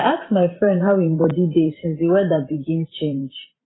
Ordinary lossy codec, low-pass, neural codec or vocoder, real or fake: AAC, 16 kbps; 7.2 kHz; vocoder, 44.1 kHz, 128 mel bands every 512 samples, BigVGAN v2; fake